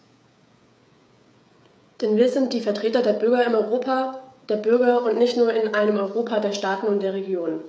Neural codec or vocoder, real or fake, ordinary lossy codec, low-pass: codec, 16 kHz, 16 kbps, FreqCodec, smaller model; fake; none; none